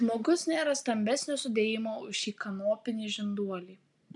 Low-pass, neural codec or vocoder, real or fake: 10.8 kHz; none; real